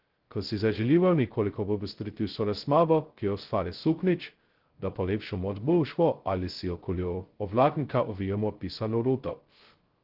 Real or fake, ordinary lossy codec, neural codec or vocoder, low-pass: fake; Opus, 16 kbps; codec, 16 kHz, 0.2 kbps, FocalCodec; 5.4 kHz